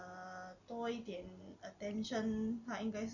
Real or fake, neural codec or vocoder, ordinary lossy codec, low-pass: real; none; none; 7.2 kHz